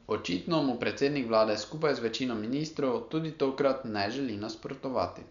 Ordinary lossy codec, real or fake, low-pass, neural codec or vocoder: none; real; 7.2 kHz; none